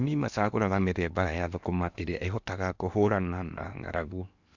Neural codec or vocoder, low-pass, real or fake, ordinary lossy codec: codec, 16 kHz in and 24 kHz out, 0.8 kbps, FocalCodec, streaming, 65536 codes; 7.2 kHz; fake; none